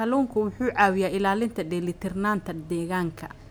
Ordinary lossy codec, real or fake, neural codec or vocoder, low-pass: none; real; none; none